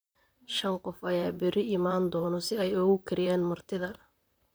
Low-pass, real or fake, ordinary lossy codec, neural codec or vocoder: none; fake; none; vocoder, 44.1 kHz, 128 mel bands, Pupu-Vocoder